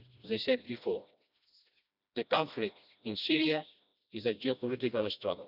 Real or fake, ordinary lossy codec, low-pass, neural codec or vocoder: fake; none; 5.4 kHz; codec, 16 kHz, 1 kbps, FreqCodec, smaller model